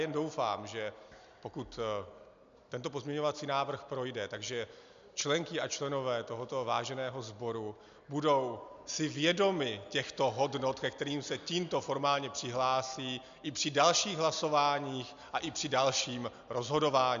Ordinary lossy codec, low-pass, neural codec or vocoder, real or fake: MP3, 64 kbps; 7.2 kHz; none; real